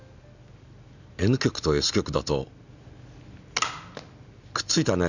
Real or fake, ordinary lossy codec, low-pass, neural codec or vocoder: real; none; 7.2 kHz; none